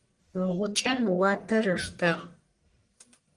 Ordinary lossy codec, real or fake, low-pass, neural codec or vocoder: Opus, 32 kbps; fake; 10.8 kHz; codec, 44.1 kHz, 1.7 kbps, Pupu-Codec